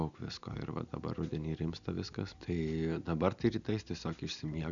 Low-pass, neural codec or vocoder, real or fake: 7.2 kHz; none; real